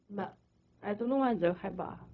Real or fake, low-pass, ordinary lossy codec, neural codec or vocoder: fake; 7.2 kHz; none; codec, 16 kHz, 0.4 kbps, LongCat-Audio-Codec